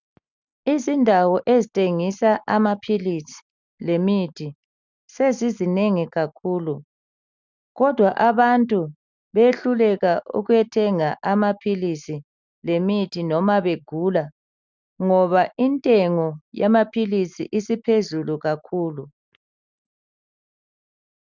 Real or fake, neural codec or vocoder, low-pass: real; none; 7.2 kHz